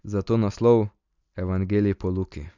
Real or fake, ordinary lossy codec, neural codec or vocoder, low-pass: real; none; none; 7.2 kHz